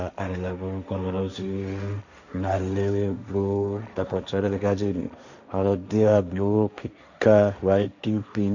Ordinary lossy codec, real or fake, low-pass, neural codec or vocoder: none; fake; 7.2 kHz; codec, 16 kHz, 1.1 kbps, Voila-Tokenizer